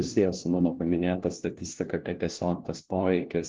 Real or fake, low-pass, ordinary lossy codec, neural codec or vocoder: fake; 7.2 kHz; Opus, 16 kbps; codec, 16 kHz, 1 kbps, FunCodec, trained on LibriTTS, 50 frames a second